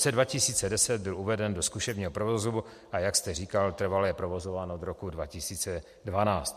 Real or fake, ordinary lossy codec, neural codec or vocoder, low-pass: real; MP3, 64 kbps; none; 14.4 kHz